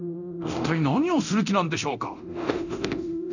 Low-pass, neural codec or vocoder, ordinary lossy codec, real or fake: 7.2 kHz; codec, 24 kHz, 0.9 kbps, DualCodec; none; fake